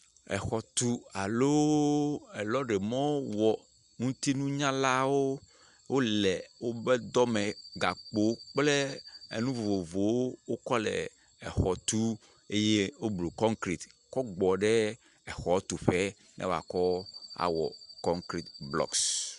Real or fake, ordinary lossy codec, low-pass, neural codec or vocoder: real; Opus, 64 kbps; 10.8 kHz; none